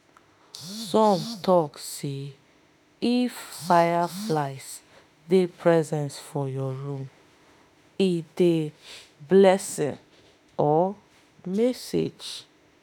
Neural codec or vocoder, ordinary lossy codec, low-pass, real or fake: autoencoder, 48 kHz, 32 numbers a frame, DAC-VAE, trained on Japanese speech; none; none; fake